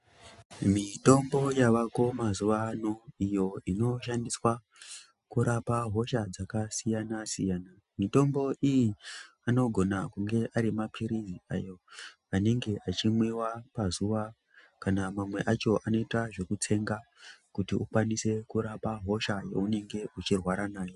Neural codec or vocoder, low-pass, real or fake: none; 10.8 kHz; real